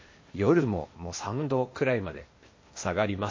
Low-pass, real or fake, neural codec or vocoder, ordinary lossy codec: 7.2 kHz; fake; codec, 16 kHz, 0.8 kbps, ZipCodec; MP3, 32 kbps